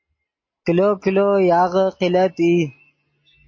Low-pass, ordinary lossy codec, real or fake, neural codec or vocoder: 7.2 kHz; MP3, 32 kbps; real; none